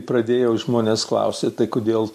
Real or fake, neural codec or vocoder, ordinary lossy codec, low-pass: real; none; MP3, 64 kbps; 14.4 kHz